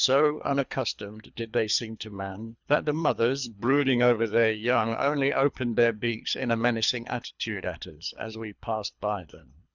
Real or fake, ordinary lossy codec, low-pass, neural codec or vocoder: fake; Opus, 64 kbps; 7.2 kHz; codec, 24 kHz, 3 kbps, HILCodec